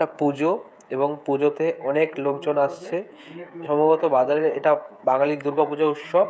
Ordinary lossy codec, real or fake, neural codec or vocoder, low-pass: none; fake; codec, 16 kHz, 16 kbps, FreqCodec, smaller model; none